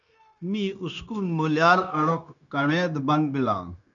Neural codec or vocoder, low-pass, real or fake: codec, 16 kHz, 0.9 kbps, LongCat-Audio-Codec; 7.2 kHz; fake